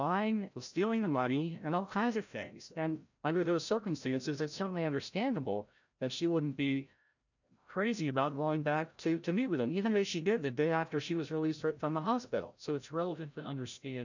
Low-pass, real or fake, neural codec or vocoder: 7.2 kHz; fake; codec, 16 kHz, 0.5 kbps, FreqCodec, larger model